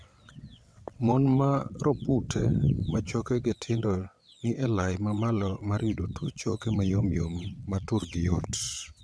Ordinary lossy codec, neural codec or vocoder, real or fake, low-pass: none; vocoder, 22.05 kHz, 80 mel bands, WaveNeXt; fake; none